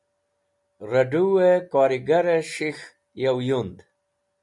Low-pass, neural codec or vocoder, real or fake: 10.8 kHz; none; real